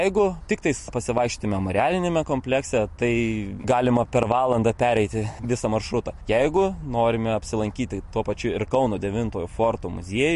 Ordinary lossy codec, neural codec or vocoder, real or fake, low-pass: MP3, 48 kbps; none; real; 14.4 kHz